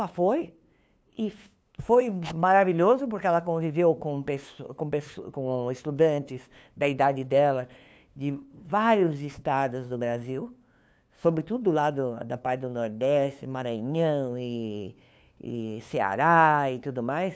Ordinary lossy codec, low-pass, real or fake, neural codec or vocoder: none; none; fake; codec, 16 kHz, 2 kbps, FunCodec, trained on LibriTTS, 25 frames a second